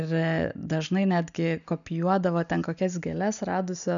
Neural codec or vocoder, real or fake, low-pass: none; real; 7.2 kHz